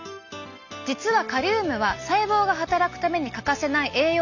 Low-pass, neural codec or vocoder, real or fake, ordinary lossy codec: 7.2 kHz; none; real; none